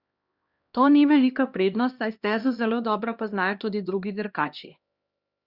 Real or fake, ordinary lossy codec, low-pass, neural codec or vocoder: fake; Opus, 64 kbps; 5.4 kHz; codec, 16 kHz, 1 kbps, X-Codec, HuBERT features, trained on LibriSpeech